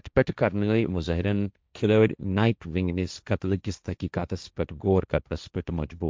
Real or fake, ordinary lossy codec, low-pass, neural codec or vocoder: fake; none; none; codec, 16 kHz, 1.1 kbps, Voila-Tokenizer